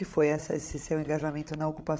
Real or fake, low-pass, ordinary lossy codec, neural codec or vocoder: fake; none; none; codec, 16 kHz, 16 kbps, FreqCodec, larger model